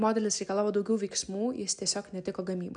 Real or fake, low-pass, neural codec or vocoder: real; 9.9 kHz; none